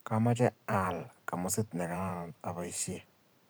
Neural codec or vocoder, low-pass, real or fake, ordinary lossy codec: vocoder, 44.1 kHz, 128 mel bands every 512 samples, BigVGAN v2; none; fake; none